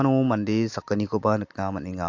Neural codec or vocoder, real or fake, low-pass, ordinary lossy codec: none; real; 7.2 kHz; AAC, 48 kbps